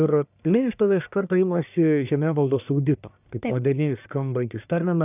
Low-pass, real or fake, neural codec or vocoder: 3.6 kHz; fake; codec, 44.1 kHz, 1.7 kbps, Pupu-Codec